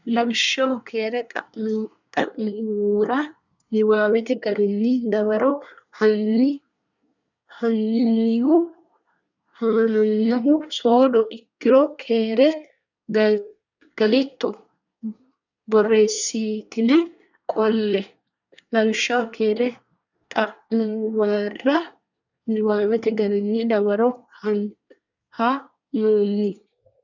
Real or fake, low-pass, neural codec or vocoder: fake; 7.2 kHz; codec, 24 kHz, 1 kbps, SNAC